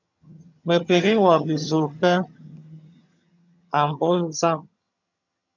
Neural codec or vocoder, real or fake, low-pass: vocoder, 22.05 kHz, 80 mel bands, HiFi-GAN; fake; 7.2 kHz